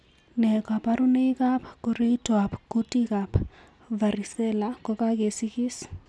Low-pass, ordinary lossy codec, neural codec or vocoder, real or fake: none; none; none; real